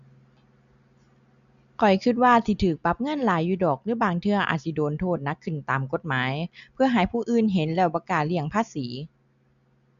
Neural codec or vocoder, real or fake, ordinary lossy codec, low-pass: none; real; none; 7.2 kHz